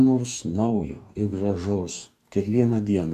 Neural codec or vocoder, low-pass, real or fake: codec, 44.1 kHz, 2.6 kbps, DAC; 14.4 kHz; fake